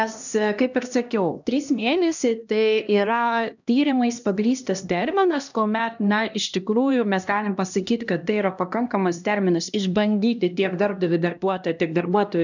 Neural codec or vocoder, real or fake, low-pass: codec, 16 kHz, 1 kbps, X-Codec, HuBERT features, trained on LibriSpeech; fake; 7.2 kHz